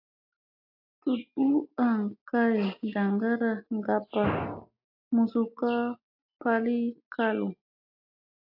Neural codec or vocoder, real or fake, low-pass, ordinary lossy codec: none; real; 5.4 kHz; AAC, 48 kbps